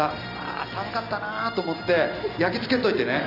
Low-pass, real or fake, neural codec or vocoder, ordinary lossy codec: 5.4 kHz; real; none; none